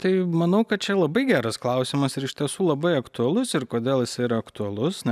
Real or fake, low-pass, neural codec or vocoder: real; 14.4 kHz; none